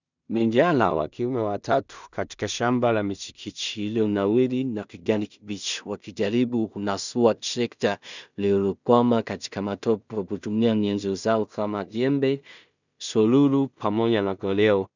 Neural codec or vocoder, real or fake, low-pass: codec, 16 kHz in and 24 kHz out, 0.4 kbps, LongCat-Audio-Codec, two codebook decoder; fake; 7.2 kHz